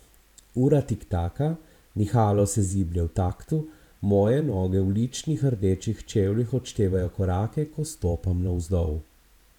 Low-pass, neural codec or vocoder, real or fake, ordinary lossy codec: 19.8 kHz; vocoder, 44.1 kHz, 128 mel bands every 512 samples, BigVGAN v2; fake; none